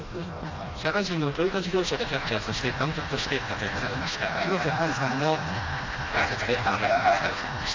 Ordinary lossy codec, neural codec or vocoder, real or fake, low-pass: AAC, 32 kbps; codec, 16 kHz, 1 kbps, FreqCodec, smaller model; fake; 7.2 kHz